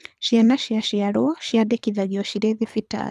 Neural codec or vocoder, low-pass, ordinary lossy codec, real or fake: codec, 24 kHz, 6 kbps, HILCodec; none; none; fake